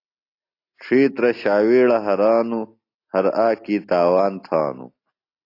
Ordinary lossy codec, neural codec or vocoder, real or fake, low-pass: AAC, 32 kbps; none; real; 5.4 kHz